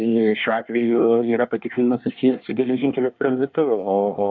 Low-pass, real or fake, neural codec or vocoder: 7.2 kHz; fake; codec, 24 kHz, 1 kbps, SNAC